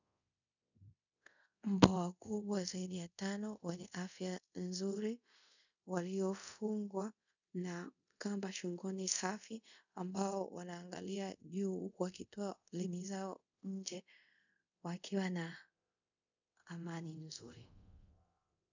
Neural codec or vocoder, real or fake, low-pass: codec, 24 kHz, 0.5 kbps, DualCodec; fake; 7.2 kHz